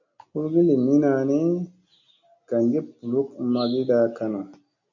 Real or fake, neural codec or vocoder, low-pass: real; none; 7.2 kHz